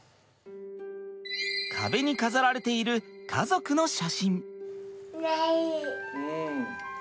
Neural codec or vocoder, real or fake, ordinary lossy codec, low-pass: none; real; none; none